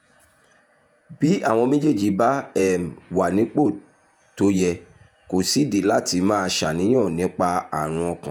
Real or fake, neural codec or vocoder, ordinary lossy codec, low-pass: fake; vocoder, 48 kHz, 128 mel bands, Vocos; none; 19.8 kHz